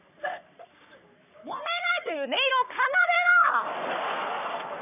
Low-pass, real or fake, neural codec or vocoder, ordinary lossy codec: 3.6 kHz; fake; codec, 44.1 kHz, 3.4 kbps, Pupu-Codec; none